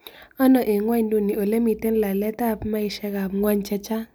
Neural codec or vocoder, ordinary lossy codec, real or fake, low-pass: none; none; real; none